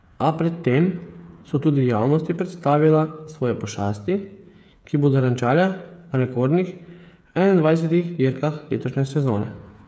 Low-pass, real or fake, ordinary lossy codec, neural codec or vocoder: none; fake; none; codec, 16 kHz, 8 kbps, FreqCodec, smaller model